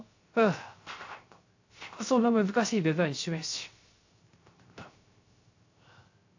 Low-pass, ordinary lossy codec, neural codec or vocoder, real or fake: 7.2 kHz; AAC, 48 kbps; codec, 16 kHz, 0.3 kbps, FocalCodec; fake